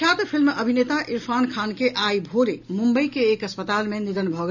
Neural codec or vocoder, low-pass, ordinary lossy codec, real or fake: none; 7.2 kHz; none; real